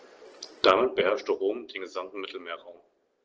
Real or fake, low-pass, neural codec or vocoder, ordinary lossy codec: real; 7.2 kHz; none; Opus, 16 kbps